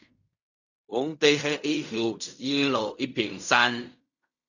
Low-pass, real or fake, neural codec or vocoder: 7.2 kHz; fake; codec, 16 kHz in and 24 kHz out, 0.4 kbps, LongCat-Audio-Codec, fine tuned four codebook decoder